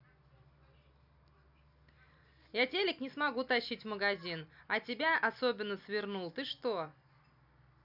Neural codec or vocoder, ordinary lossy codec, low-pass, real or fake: none; none; 5.4 kHz; real